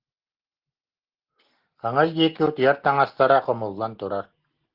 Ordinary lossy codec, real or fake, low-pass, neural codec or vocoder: Opus, 16 kbps; real; 5.4 kHz; none